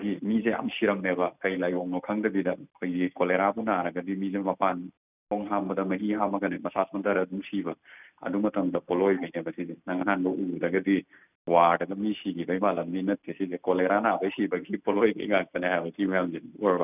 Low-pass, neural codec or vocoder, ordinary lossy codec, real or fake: 3.6 kHz; none; none; real